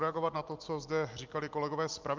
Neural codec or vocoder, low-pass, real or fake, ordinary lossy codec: none; 7.2 kHz; real; Opus, 24 kbps